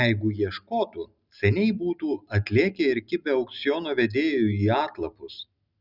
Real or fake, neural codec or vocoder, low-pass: real; none; 5.4 kHz